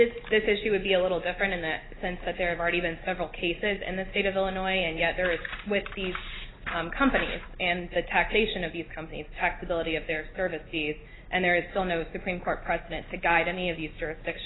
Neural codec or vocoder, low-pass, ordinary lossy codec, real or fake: none; 7.2 kHz; AAC, 16 kbps; real